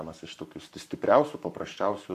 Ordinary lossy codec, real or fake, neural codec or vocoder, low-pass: AAC, 96 kbps; fake; codec, 44.1 kHz, 7.8 kbps, Pupu-Codec; 14.4 kHz